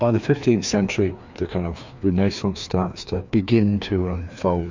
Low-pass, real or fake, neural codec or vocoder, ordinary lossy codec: 7.2 kHz; fake; codec, 16 kHz, 2 kbps, FreqCodec, larger model; MP3, 64 kbps